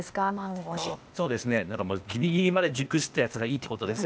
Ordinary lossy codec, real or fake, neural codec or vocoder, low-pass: none; fake; codec, 16 kHz, 0.8 kbps, ZipCodec; none